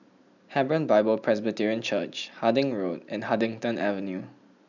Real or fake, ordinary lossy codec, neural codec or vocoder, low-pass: real; none; none; 7.2 kHz